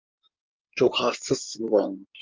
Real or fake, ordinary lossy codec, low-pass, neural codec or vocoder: fake; Opus, 24 kbps; 7.2 kHz; codec, 16 kHz in and 24 kHz out, 2.2 kbps, FireRedTTS-2 codec